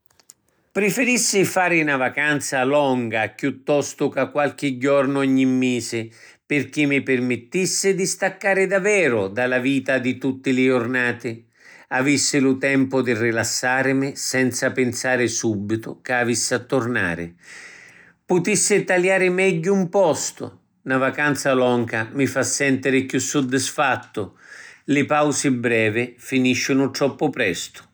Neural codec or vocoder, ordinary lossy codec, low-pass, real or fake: none; none; none; real